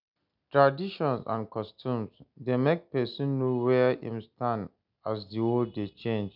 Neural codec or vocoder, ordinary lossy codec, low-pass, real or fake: none; none; 5.4 kHz; real